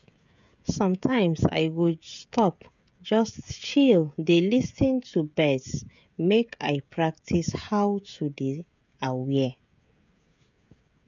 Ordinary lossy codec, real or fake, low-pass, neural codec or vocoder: none; fake; 7.2 kHz; codec, 16 kHz, 16 kbps, FreqCodec, smaller model